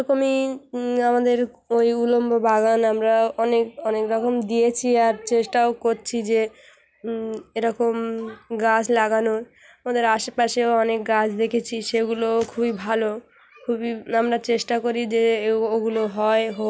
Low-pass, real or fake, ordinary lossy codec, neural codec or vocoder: none; real; none; none